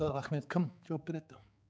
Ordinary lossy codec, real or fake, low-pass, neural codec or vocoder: none; fake; none; codec, 16 kHz, 4 kbps, X-Codec, HuBERT features, trained on balanced general audio